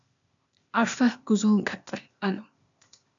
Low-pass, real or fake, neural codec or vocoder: 7.2 kHz; fake; codec, 16 kHz, 0.8 kbps, ZipCodec